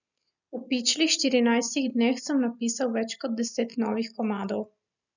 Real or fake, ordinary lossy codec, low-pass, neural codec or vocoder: real; none; 7.2 kHz; none